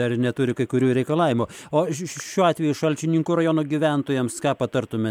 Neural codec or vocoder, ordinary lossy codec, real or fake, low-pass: none; MP3, 96 kbps; real; 19.8 kHz